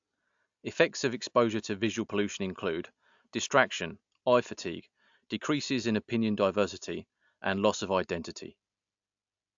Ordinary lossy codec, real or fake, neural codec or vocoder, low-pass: AAC, 64 kbps; real; none; 7.2 kHz